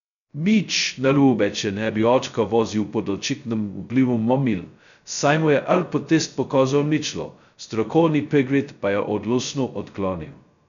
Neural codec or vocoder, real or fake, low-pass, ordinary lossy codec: codec, 16 kHz, 0.2 kbps, FocalCodec; fake; 7.2 kHz; none